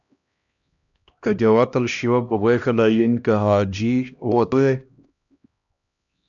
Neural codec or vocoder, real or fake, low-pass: codec, 16 kHz, 1 kbps, X-Codec, HuBERT features, trained on LibriSpeech; fake; 7.2 kHz